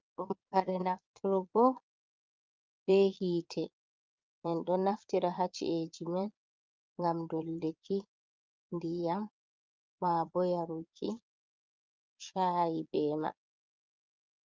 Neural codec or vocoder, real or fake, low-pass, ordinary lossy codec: vocoder, 22.05 kHz, 80 mel bands, Vocos; fake; 7.2 kHz; Opus, 24 kbps